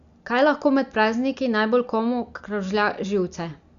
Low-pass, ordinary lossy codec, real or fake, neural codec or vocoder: 7.2 kHz; none; real; none